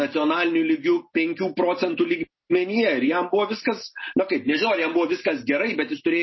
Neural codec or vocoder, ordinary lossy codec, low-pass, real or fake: none; MP3, 24 kbps; 7.2 kHz; real